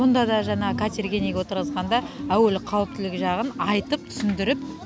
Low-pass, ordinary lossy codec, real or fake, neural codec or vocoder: none; none; real; none